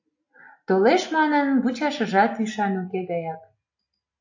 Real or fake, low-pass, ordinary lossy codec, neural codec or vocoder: real; 7.2 kHz; AAC, 48 kbps; none